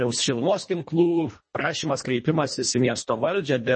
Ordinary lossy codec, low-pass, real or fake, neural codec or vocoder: MP3, 32 kbps; 9.9 kHz; fake; codec, 24 kHz, 1.5 kbps, HILCodec